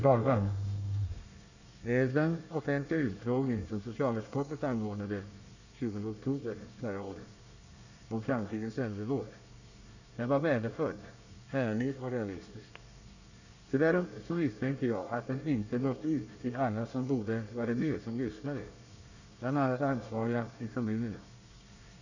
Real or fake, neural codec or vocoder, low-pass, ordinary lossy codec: fake; codec, 24 kHz, 1 kbps, SNAC; 7.2 kHz; none